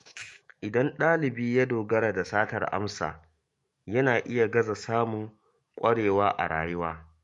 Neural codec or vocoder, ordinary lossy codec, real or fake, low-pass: autoencoder, 48 kHz, 128 numbers a frame, DAC-VAE, trained on Japanese speech; MP3, 48 kbps; fake; 14.4 kHz